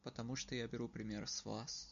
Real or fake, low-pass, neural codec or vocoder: real; 7.2 kHz; none